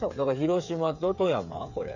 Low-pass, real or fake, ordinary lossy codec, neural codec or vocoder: 7.2 kHz; fake; none; codec, 16 kHz, 8 kbps, FreqCodec, smaller model